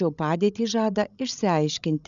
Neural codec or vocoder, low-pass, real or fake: codec, 16 kHz, 16 kbps, FreqCodec, larger model; 7.2 kHz; fake